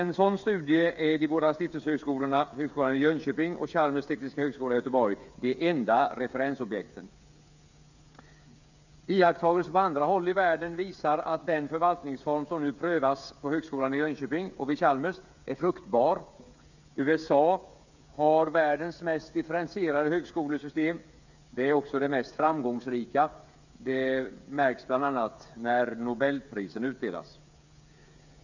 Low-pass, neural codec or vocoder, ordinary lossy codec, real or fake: 7.2 kHz; codec, 16 kHz, 8 kbps, FreqCodec, smaller model; none; fake